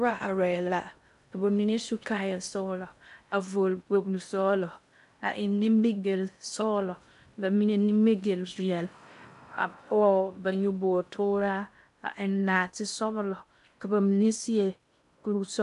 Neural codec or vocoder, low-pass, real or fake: codec, 16 kHz in and 24 kHz out, 0.6 kbps, FocalCodec, streaming, 2048 codes; 10.8 kHz; fake